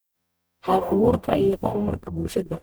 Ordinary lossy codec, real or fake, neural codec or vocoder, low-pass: none; fake; codec, 44.1 kHz, 0.9 kbps, DAC; none